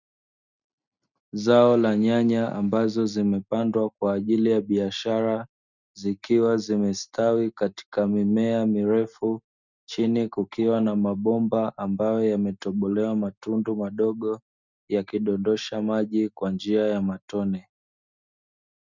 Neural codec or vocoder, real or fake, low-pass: none; real; 7.2 kHz